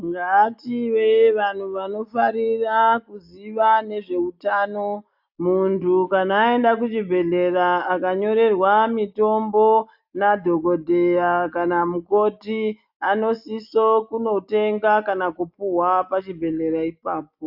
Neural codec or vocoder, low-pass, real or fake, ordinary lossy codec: none; 5.4 kHz; real; AAC, 48 kbps